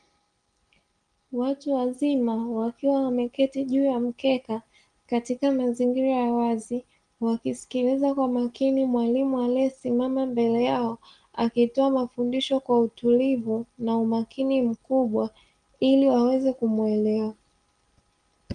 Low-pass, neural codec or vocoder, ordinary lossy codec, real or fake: 9.9 kHz; none; Opus, 24 kbps; real